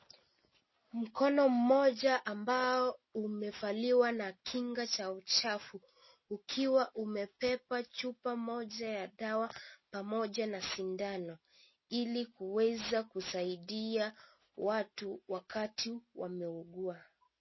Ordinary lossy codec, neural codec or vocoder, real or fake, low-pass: MP3, 24 kbps; none; real; 7.2 kHz